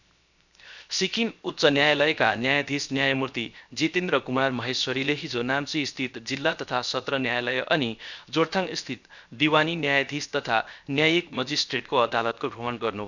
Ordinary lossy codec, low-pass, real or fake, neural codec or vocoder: none; 7.2 kHz; fake; codec, 16 kHz, 0.7 kbps, FocalCodec